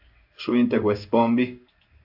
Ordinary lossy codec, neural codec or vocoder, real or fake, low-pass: AAC, 48 kbps; codec, 16 kHz in and 24 kHz out, 1 kbps, XY-Tokenizer; fake; 5.4 kHz